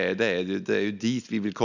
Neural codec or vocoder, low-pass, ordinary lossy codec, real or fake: none; 7.2 kHz; none; real